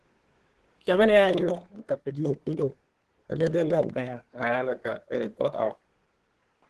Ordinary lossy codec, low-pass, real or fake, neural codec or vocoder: Opus, 16 kbps; 10.8 kHz; fake; codec, 24 kHz, 1 kbps, SNAC